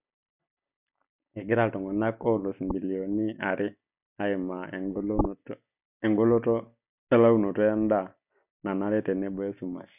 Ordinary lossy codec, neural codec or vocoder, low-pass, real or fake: none; none; 3.6 kHz; real